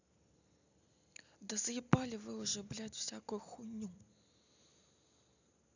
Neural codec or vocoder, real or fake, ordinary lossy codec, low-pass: none; real; none; 7.2 kHz